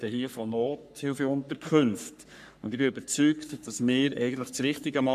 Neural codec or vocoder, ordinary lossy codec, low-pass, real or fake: codec, 44.1 kHz, 3.4 kbps, Pupu-Codec; none; 14.4 kHz; fake